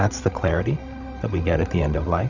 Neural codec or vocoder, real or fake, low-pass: codec, 16 kHz, 16 kbps, FreqCodec, larger model; fake; 7.2 kHz